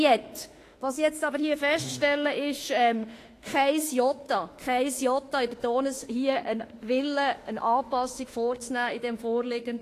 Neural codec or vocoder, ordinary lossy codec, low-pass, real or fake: autoencoder, 48 kHz, 32 numbers a frame, DAC-VAE, trained on Japanese speech; AAC, 48 kbps; 14.4 kHz; fake